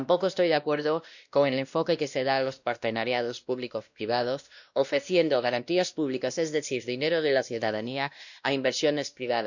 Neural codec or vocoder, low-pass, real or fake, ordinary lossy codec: codec, 16 kHz, 1 kbps, X-Codec, WavLM features, trained on Multilingual LibriSpeech; 7.2 kHz; fake; none